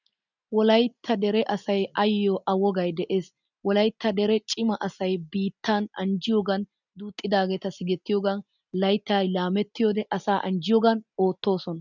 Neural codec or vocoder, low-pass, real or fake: none; 7.2 kHz; real